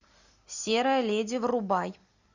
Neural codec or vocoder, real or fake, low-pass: none; real; 7.2 kHz